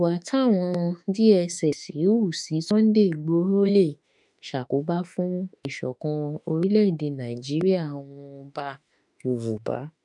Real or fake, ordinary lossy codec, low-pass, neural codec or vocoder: fake; none; 10.8 kHz; autoencoder, 48 kHz, 32 numbers a frame, DAC-VAE, trained on Japanese speech